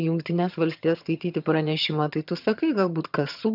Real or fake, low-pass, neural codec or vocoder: fake; 5.4 kHz; vocoder, 22.05 kHz, 80 mel bands, HiFi-GAN